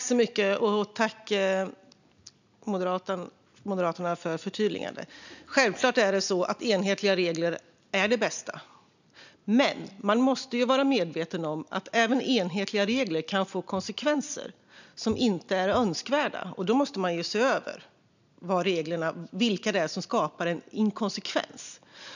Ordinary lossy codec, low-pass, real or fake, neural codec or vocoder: none; 7.2 kHz; real; none